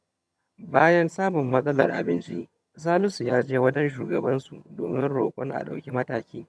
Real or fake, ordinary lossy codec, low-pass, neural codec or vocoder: fake; none; none; vocoder, 22.05 kHz, 80 mel bands, HiFi-GAN